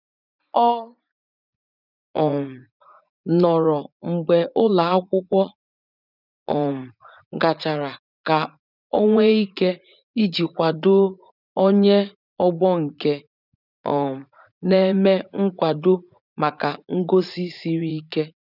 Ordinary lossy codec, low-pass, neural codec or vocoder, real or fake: none; 5.4 kHz; vocoder, 44.1 kHz, 80 mel bands, Vocos; fake